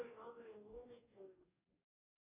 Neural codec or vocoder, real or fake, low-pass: codec, 44.1 kHz, 2.6 kbps, DAC; fake; 3.6 kHz